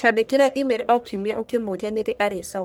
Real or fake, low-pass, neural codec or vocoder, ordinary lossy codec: fake; none; codec, 44.1 kHz, 1.7 kbps, Pupu-Codec; none